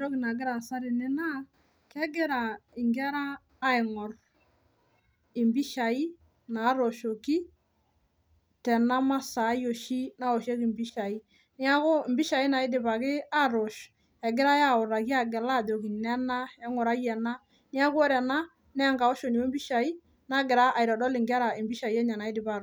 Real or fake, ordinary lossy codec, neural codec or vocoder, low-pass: real; none; none; none